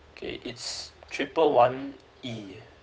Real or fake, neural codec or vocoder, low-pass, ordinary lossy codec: fake; codec, 16 kHz, 8 kbps, FunCodec, trained on Chinese and English, 25 frames a second; none; none